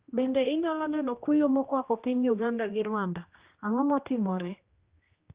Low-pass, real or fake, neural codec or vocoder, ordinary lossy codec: 3.6 kHz; fake; codec, 16 kHz, 1 kbps, X-Codec, HuBERT features, trained on general audio; Opus, 32 kbps